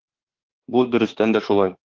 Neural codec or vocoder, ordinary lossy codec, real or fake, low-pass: autoencoder, 48 kHz, 32 numbers a frame, DAC-VAE, trained on Japanese speech; Opus, 16 kbps; fake; 7.2 kHz